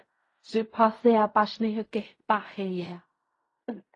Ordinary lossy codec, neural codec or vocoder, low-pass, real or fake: AAC, 32 kbps; codec, 16 kHz in and 24 kHz out, 0.4 kbps, LongCat-Audio-Codec, fine tuned four codebook decoder; 10.8 kHz; fake